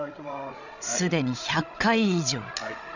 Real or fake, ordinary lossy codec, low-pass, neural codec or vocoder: fake; none; 7.2 kHz; codec, 16 kHz, 16 kbps, FreqCodec, larger model